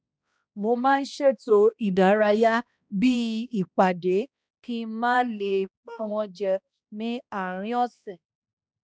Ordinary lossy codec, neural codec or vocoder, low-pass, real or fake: none; codec, 16 kHz, 1 kbps, X-Codec, HuBERT features, trained on balanced general audio; none; fake